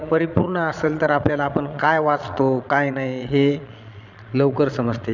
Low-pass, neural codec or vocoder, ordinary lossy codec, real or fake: 7.2 kHz; vocoder, 22.05 kHz, 80 mel bands, Vocos; none; fake